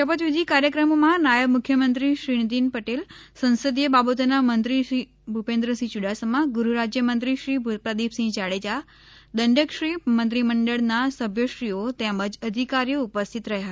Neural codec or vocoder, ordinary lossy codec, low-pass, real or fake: none; none; none; real